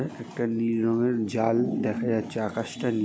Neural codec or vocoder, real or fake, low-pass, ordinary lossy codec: none; real; none; none